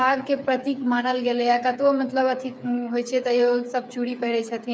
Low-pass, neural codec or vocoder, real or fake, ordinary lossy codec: none; codec, 16 kHz, 8 kbps, FreqCodec, smaller model; fake; none